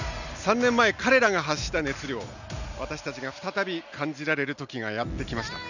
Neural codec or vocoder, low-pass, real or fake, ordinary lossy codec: none; 7.2 kHz; real; none